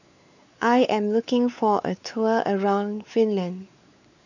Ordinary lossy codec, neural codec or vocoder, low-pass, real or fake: none; codec, 16 kHz, 4 kbps, X-Codec, WavLM features, trained on Multilingual LibriSpeech; 7.2 kHz; fake